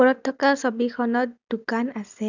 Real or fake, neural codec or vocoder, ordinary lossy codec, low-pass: real; none; none; 7.2 kHz